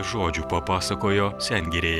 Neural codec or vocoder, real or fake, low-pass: none; real; 19.8 kHz